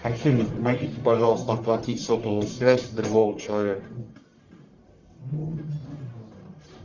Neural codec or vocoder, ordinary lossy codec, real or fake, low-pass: codec, 44.1 kHz, 1.7 kbps, Pupu-Codec; Opus, 64 kbps; fake; 7.2 kHz